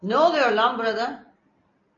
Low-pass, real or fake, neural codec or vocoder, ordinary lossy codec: 7.2 kHz; real; none; AAC, 32 kbps